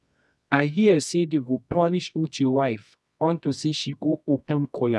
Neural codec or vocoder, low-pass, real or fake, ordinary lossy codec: codec, 24 kHz, 0.9 kbps, WavTokenizer, medium music audio release; none; fake; none